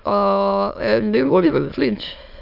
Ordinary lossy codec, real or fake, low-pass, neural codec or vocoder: AAC, 48 kbps; fake; 5.4 kHz; autoencoder, 22.05 kHz, a latent of 192 numbers a frame, VITS, trained on many speakers